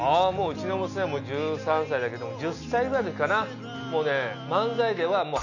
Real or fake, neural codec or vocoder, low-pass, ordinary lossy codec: real; none; 7.2 kHz; none